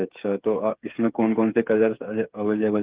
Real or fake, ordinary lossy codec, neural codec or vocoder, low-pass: fake; Opus, 32 kbps; codec, 16 kHz, 8 kbps, FreqCodec, smaller model; 3.6 kHz